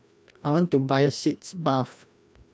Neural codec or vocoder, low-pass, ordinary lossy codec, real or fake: codec, 16 kHz, 1 kbps, FreqCodec, larger model; none; none; fake